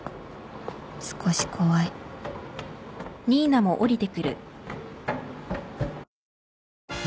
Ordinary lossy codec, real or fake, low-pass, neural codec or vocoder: none; real; none; none